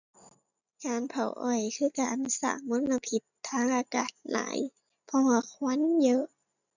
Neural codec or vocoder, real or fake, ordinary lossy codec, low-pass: none; real; none; 7.2 kHz